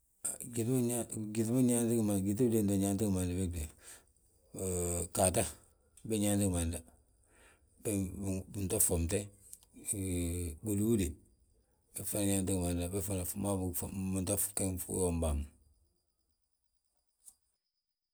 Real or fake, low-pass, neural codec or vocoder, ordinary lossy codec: real; none; none; none